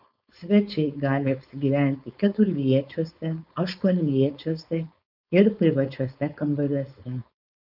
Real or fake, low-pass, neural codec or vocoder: fake; 5.4 kHz; codec, 16 kHz, 4.8 kbps, FACodec